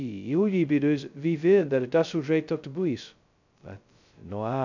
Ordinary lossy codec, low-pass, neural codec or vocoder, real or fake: none; 7.2 kHz; codec, 16 kHz, 0.2 kbps, FocalCodec; fake